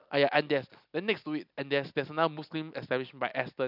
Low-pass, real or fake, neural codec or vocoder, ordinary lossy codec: 5.4 kHz; real; none; none